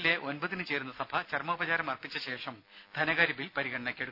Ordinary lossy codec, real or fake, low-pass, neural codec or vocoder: none; real; 5.4 kHz; none